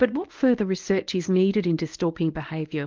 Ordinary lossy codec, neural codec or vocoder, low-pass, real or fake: Opus, 32 kbps; codec, 24 kHz, 0.9 kbps, WavTokenizer, medium speech release version 1; 7.2 kHz; fake